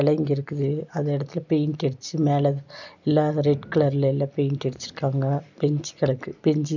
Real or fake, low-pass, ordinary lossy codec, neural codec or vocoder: real; 7.2 kHz; none; none